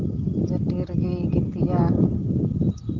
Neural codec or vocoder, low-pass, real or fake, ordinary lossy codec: none; 7.2 kHz; real; Opus, 16 kbps